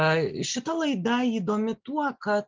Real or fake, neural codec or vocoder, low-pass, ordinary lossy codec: real; none; 7.2 kHz; Opus, 24 kbps